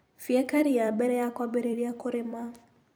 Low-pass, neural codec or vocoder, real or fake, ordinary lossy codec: none; vocoder, 44.1 kHz, 128 mel bands every 256 samples, BigVGAN v2; fake; none